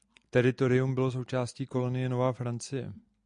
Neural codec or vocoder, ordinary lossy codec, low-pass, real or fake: none; MP3, 96 kbps; 9.9 kHz; real